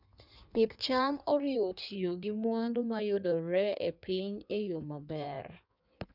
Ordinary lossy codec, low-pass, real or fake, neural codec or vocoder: none; 5.4 kHz; fake; codec, 16 kHz in and 24 kHz out, 1.1 kbps, FireRedTTS-2 codec